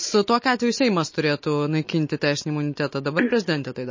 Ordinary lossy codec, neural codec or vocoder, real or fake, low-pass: MP3, 32 kbps; none; real; 7.2 kHz